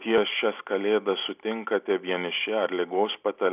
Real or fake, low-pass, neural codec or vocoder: real; 3.6 kHz; none